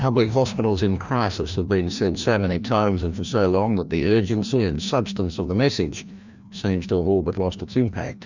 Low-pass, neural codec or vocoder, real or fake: 7.2 kHz; codec, 16 kHz, 1 kbps, FreqCodec, larger model; fake